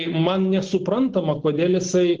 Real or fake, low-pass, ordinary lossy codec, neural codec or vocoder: real; 7.2 kHz; Opus, 16 kbps; none